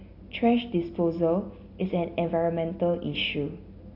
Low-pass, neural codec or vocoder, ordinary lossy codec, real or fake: 5.4 kHz; none; AAC, 32 kbps; real